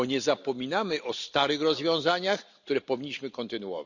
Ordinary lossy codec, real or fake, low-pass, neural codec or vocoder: none; real; 7.2 kHz; none